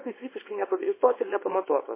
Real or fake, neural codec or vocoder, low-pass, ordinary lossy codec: fake; codec, 24 kHz, 0.9 kbps, WavTokenizer, small release; 3.6 kHz; MP3, 16 kbps